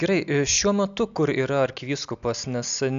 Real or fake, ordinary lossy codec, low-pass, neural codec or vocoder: real; AAC, 96 kbps; 7.2 kHz; none